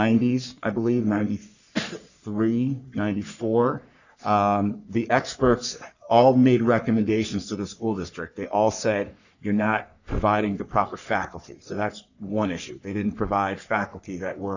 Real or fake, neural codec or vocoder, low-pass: fake; codec, 44.1 kHz, 3.4 kbps, Pupu-Codec; 7.2 kHz